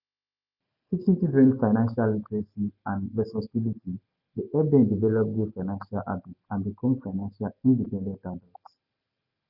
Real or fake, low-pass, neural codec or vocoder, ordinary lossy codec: real; 5.4 kHz; none; none